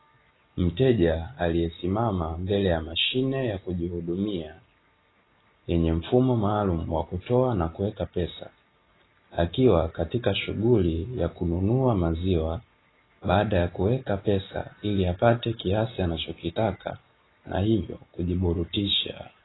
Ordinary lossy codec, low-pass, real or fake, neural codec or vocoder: AAC, 16 kbps; 7.2 kHz; real; none